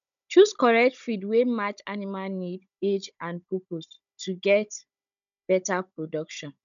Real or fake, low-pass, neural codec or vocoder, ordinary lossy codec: fake; 7.2 kHz; codec, 16 kHz, 16 kbps, FunCodec, trained on Chinese and English, 50 frames a second; none